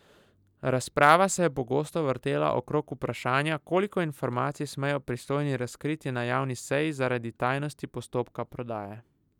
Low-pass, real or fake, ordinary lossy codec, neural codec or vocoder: 19.8 kHz; real; none; none